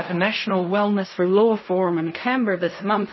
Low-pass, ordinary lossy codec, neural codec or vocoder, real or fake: 7.2 kHz; MP3, 24 kbps; codec, 16 kHz in and 24 kHz out, 0.4 kbps, LongCat-Audio-Codec, fine tuned four codebook decoder; fake